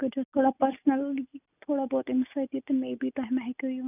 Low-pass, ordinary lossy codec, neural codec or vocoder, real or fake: 3.6 kHz; none; none; real